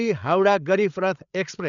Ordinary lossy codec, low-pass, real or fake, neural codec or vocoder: none; 7.2 kHz; fake; codec, 16 kHz, 8 kbps, FreqCodec, larger model